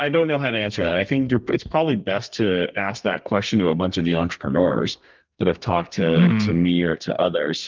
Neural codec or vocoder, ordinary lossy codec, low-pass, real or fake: codec, 32 kHz, 1.9 kbps, SNAC; Opus, 24 kbps; 7.2 kHz; fake